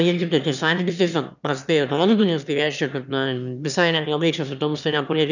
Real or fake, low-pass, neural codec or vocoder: fake; 7.2 kHz; autoencoder, 22.05 kHz, a latent of 192 numbers a frame, VITS, trained on one speaker